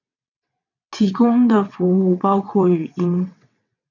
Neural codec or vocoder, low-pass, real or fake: vocoder, 22.05 kHz, 80 mel bands, WaveNeXt; 7.2 kHz; fake